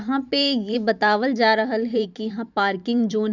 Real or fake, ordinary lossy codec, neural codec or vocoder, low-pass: fake; none; vocoder, 44.1 kHz, 128 mel bands every 512 samples, BigVGAN v2; 7.2 kHz